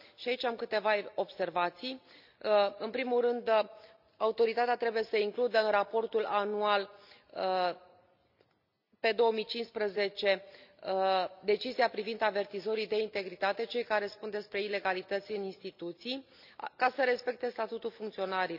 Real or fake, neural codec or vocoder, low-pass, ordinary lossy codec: real; none; 5.4 kHz; none